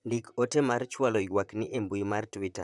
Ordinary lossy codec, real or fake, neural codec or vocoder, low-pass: none; fake; vocoder, 44.1 kHz, 128 mel bands, Pupu-Vocoder; 10.8 kHz